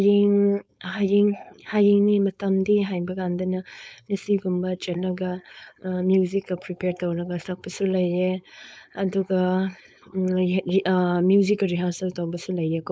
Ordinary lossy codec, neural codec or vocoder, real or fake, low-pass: none; codec, 16 kHz, 4.8 kbps, FACodec; fake; none